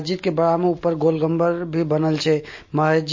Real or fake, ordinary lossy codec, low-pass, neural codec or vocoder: real; MP3, 32 kbps; 7.2 kHz; none